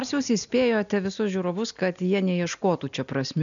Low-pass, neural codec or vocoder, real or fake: 7.2 kHz; none; real